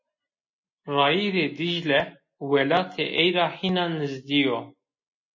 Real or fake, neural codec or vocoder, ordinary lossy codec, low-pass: real; none; MP3, 32 kbps; 7.2 kHz